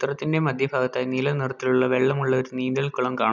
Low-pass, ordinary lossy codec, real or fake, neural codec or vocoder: 7.2 kHz; none; real; none